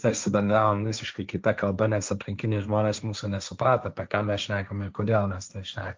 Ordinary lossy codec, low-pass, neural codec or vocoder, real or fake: Opus, 24 kbps; 7.2 kHz; codec, 16 kHz, 1.1 kbps, Voila-Tokenizer; fake